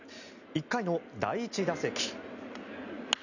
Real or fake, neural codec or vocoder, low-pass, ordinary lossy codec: real; none; 7.2 kHz; none